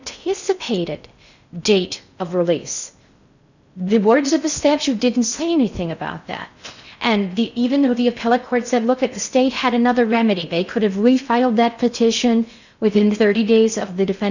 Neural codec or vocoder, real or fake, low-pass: codec, 16 kHz in and 24 kHz out, 0.6 kbps, FocalCodec, streaming, 4096 codes; fake; 7.2 kHz